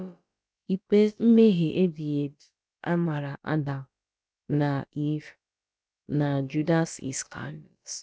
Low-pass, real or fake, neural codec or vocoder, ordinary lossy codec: none; fake; codec, 16 kHz, about 1 kbps, DyCAST, with the encoder's durations; none